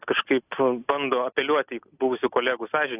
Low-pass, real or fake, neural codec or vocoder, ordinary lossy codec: 3.6 kHz; real; none; AAC, 32 kbps